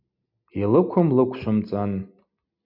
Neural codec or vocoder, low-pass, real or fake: none; 5.4 kHz; real